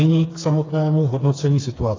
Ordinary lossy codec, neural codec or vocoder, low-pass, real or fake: AAC, 32 kbps; codec, 16 kHz, 4 kbps, FreqCodec, smaller model; 7.2 kHz; fake